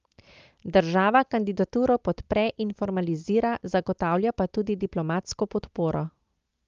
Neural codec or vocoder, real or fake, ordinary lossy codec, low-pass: none; real; Opus, 32 kbps; 7.2 kHz